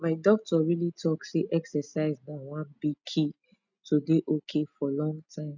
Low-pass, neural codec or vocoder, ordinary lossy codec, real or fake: 7.2 kHz; none; none; real